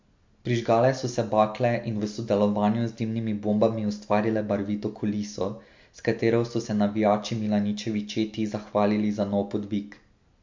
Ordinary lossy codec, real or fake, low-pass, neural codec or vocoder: MP3, 48 kbps; real; 7.2 kHz; none